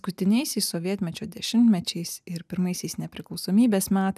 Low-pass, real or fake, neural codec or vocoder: 14.4 kHz; real; none